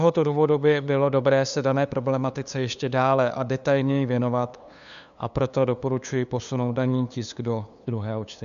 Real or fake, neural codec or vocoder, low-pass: fake; codec, 16 kHz, 2 kbps, FunCodec, trained on LibriTTS, 25 frames a second; 7.2 kHz